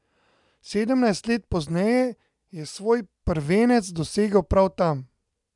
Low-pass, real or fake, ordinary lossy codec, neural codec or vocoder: 10.8 kHz; real; MP3, 96 kbps; none